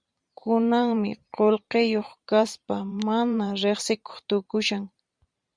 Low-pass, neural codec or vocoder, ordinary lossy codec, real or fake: 9.9 kHz; vocoder, 44.1 kHz, 128 mel bands every 512 samples, BigVGAN v2; Opus, 64 kbps; fake